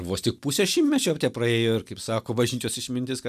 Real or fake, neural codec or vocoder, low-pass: real; none; 14.4 kHz